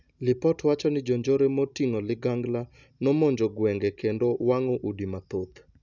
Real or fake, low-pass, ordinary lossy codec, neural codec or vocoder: real; 7.2 kHz; none; none